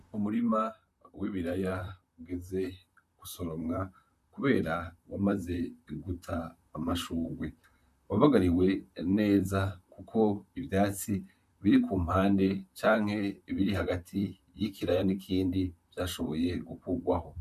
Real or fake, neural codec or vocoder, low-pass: fake; vocoder, 44.1 kHz, 128 mel bands, Pupu-Vocoder; 14.4 kHz